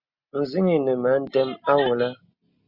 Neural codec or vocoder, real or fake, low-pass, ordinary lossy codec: none; real; 5.4 kHz; Opus, 64 kbps